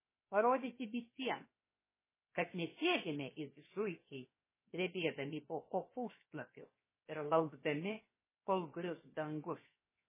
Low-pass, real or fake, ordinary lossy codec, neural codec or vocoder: 3.6 kHz; fake; MP3, 16 kbps; codec, 16 kHz, 0.7 kbps, FocalCodec